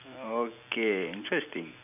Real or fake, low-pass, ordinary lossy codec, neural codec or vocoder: fake; 3.6 kHz; none; vocoder, 44.1 kHz, 128 mel bands every 256 samples, BigVGAN v2